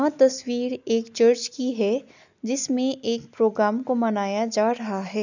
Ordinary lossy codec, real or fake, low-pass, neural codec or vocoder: none; real; 7.2 kHz; none